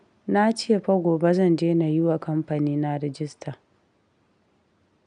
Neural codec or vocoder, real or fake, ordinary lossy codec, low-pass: none; real; none; 9.9 kHz